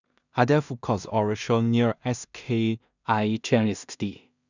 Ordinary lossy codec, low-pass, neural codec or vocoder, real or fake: none; 7.2 kHz; codec, 16 kHz in and 24 kHz out, 0.4 kbps, LongCat-Audio-Codec, two codebook decoder; fake